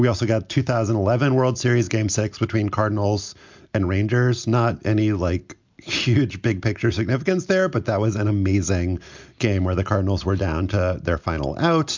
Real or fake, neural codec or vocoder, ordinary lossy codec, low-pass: real; none; MP3, 64 kbps; 7.2 kHz